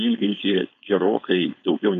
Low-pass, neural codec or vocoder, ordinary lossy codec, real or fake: 7.2 kHz; codec, 16 kHz, 4.8 kbps, FACodec; AAC, 96 kbps; fake